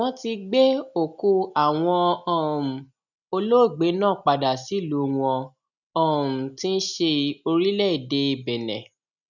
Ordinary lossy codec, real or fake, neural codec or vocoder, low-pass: none; real; none; 7.2 kHz